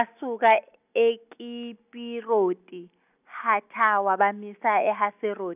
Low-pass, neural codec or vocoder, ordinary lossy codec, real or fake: 3.6 kHz; none; none; real